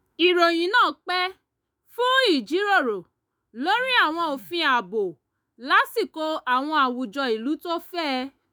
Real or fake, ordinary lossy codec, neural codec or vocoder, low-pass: fake; none; autoencoder, 48 kHz, 128 numbers a frame, DAC-VAE, trained on Japanese speech; none